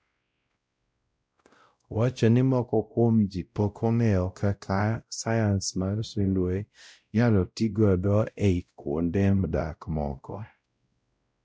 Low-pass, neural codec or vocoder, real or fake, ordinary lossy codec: none; codec, 16 kHz, 0.5 kbps, X-Codec, WavLM features, trained on Multilingual LibriSpeech; fake; none